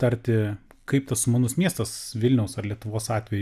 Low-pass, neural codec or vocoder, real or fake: 14.4 kHz; none; real